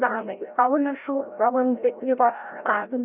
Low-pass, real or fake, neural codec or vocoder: 3.6 kHz; fake; codec, 16 kHz, 0.5 kbps, FreqCodec, larger model